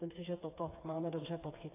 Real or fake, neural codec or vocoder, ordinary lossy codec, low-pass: fake; codec, 16 kHz, 4 kbps, FreqCodec, smaller model; AAC, 24 kbps; 3.6 kHz